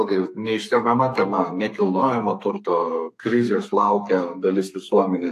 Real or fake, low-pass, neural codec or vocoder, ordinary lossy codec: fake; 14.4 kHz; codec, 32 kHz, 1.9 kbps, SNAC; AAC, 64 kbps